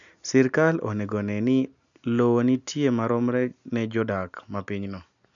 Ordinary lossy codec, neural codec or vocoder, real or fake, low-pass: none; none; real; 7.2 kHz